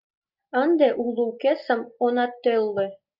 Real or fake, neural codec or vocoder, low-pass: real; none; 5.4 kHz